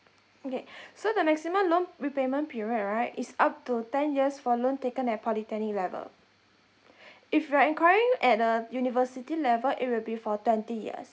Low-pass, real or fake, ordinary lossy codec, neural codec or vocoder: none; real; none; none